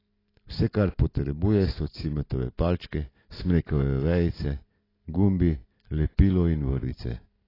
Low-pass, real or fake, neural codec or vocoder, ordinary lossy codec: 5.4 kHz; real; none; AAC, 24 kbps